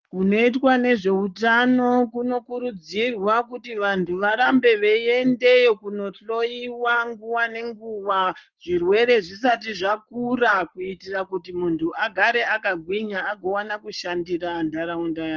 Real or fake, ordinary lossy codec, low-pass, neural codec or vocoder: fake; Opus, 24 kbps; 7.2 kHz; codec, 44.1 kHz, 7.8 kbps, Pupu-Codec